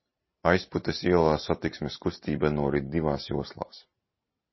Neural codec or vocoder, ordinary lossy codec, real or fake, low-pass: none; MP3, 24 kbps; real; 7.2 kHz